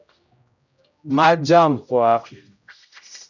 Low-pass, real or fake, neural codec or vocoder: 7.2 kHz; fake; codec, 16 kHz, 0.5 kbps, X-Codec, HuBERT features, trained on general audio